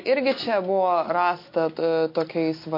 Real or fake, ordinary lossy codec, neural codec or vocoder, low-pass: real; MP3, 32 kbps; none; 5.4 kHz